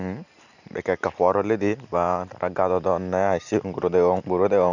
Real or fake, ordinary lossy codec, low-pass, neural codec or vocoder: real; none; 7.2 kHz; none